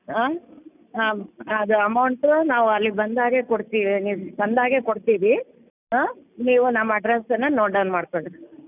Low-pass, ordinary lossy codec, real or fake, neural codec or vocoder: 3.6 kHz; none; real; none